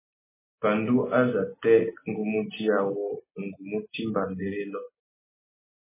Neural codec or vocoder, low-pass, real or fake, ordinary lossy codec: none; 3.6 kHz; real; MP3, 16 kbps